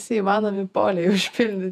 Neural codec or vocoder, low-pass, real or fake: vocoder, 48 kHz, 128 mel bands, Vocos; 14.4 kHz; fake